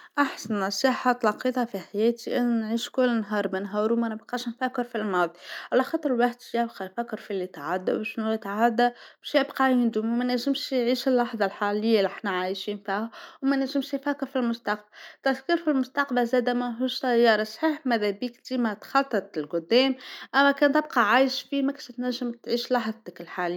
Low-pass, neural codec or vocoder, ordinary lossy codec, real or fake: 19.8 kHz; none; none; real